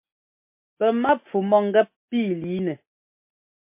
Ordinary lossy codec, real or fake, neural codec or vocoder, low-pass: MP3, 24 kbps; real; none; 3.6 kHz